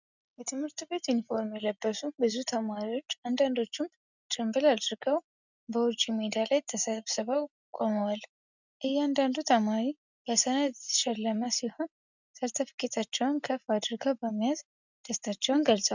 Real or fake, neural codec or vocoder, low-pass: real; none; 7.2 kHz